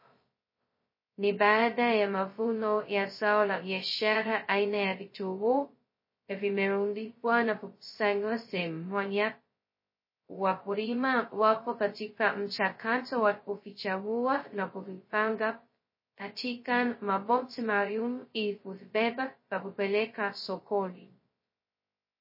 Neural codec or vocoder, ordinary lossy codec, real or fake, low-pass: codec, 16 kHz, 0.2 kbps, FocalCodec; MP3, 24 kbps; fake; 5.4 kHz